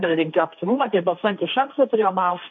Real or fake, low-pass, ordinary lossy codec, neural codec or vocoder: fake; 7.2 kHz; MP3, 48 kbps; codec, 16 kHz, 1.1 kbps, Voila-Tokenizer